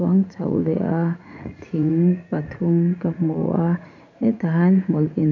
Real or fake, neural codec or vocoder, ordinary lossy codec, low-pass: fake; vocoder, 44.1 kHz, 80 mel bands, Vocos; none; 7.2 kHz